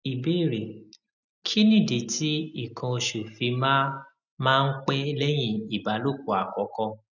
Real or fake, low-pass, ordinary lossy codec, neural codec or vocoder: real; 7.2 kHz; none; none